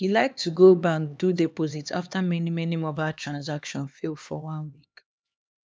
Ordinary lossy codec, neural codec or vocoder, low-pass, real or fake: none; codec, 16 kHz, 2 kbps, X-Codec, HuBERT features, trained on LibriSpeech; none; fake